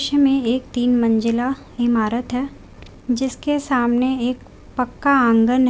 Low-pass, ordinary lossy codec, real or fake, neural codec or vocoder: none; none; real; none